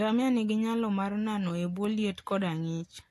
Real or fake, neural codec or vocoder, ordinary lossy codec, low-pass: real; none; AAC, 64 kbps; 14.4 kHz